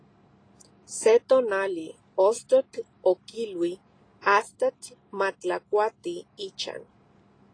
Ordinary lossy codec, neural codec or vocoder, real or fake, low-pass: AAC, 32 kbps; none; real; 9.9 kHz